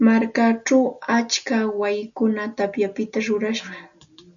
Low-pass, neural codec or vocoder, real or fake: 7.2 kHz; none; real